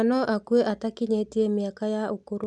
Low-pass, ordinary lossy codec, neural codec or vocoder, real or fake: 10.8 kHz; none; none; real